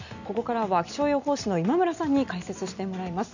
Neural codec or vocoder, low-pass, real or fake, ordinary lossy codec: none; 7.2 kHz; real; none